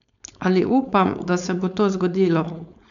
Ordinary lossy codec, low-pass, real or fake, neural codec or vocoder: none; 7.2 kHz; fake; codec, 16 kHz, 4.8 kbps, FACodec